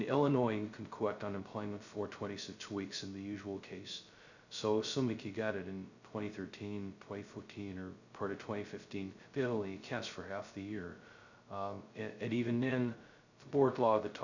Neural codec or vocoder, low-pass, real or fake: codec, 16 kHz, 0.2 kbps, FocalCodec; 7.2 kHz; fake